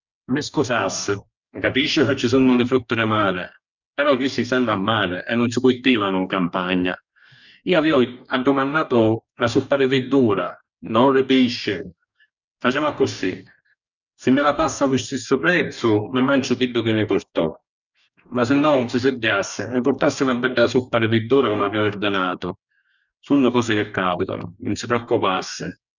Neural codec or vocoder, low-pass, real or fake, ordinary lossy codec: codec, 44.1 kHz, 2.6 kbps, DAC; 7.2 kHz; fake; none